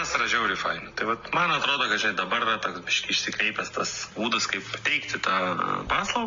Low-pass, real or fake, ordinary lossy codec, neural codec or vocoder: 7.2 kHz; real; MP3, 48 kbps; none